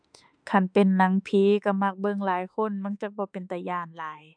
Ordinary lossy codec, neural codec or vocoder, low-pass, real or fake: none; autoencoder, 48 kHz, 32 numbers a frame, DAC-VAE, trained on Japanese speech; 9.9 kHz; fake